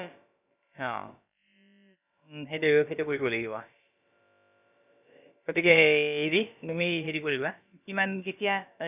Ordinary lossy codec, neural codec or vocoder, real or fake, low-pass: none; codec, 16 kHz, about 1 kbps, DyCAST, with the encoder's durations; fake; 3.6 kHz